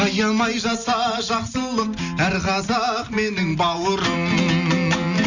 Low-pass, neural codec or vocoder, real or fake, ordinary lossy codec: 7.2 kHz; none; real; none